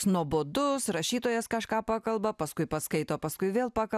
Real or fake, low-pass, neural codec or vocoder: real; 14.4 kHz; none